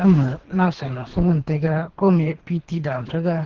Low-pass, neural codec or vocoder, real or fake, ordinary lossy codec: 7.2 kHz; codec, 24 kHz, 3 kbps, HILCodec; fake; Opus, 16 kbps